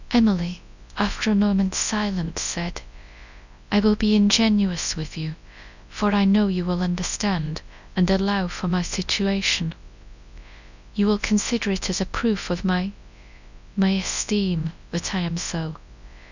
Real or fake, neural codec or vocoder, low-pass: fake; codec, 24 kHz, 0.9 kbps, WavTokenizer, large speech release; 7.2 kHz